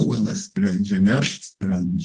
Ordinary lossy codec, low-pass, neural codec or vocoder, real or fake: Opus, 16 kbps; 10.8 kHz; codec, 24 kHz, 0.9 kbps, WavTokenizer, medium music audio release; fake